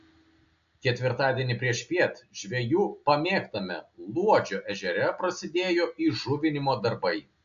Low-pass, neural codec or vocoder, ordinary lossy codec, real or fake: 7.2 kHz; none; AAC, 64 kbps; real